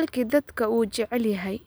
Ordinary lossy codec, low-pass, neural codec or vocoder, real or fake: none; none; none; real